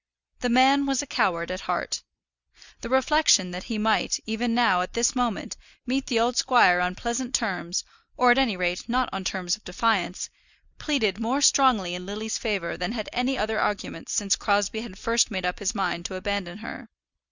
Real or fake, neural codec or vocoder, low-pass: real; none; 7.2 kHz